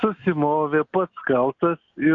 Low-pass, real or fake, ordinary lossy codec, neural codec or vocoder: 7.2 kHz; real; MP3, 96 kbps; none